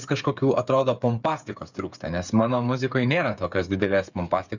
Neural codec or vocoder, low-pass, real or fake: codec, 16 kHz, 8 kbps, FreqCodec, smaller model; 7.2 kHz; fake